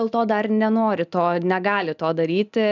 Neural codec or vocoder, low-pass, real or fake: none; 7.2 kHz; real